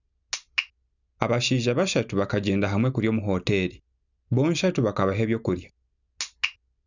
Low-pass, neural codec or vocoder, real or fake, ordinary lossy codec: 7.2 kHz; none; real; none